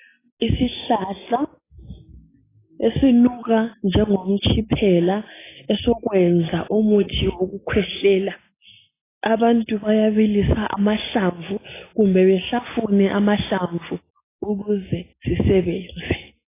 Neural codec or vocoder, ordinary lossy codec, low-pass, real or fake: none; AAC, 16 kbps; 3.6 kHz; real